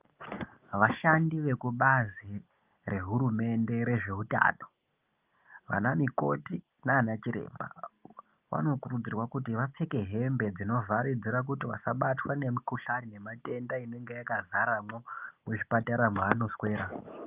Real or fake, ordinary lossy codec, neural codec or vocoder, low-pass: real; Opus, 32 kbps; none; 3.6 kHz